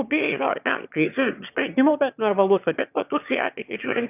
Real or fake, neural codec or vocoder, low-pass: fake; autoencoder, 22.05 kHz, a latent of 192 numbers a frame, VITS, trained on one speaker; 3.6 kHz